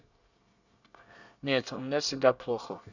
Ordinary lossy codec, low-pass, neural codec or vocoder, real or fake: none; 7.2 kHz; codec, 24 kHz, 1 kbps, SNAC; fake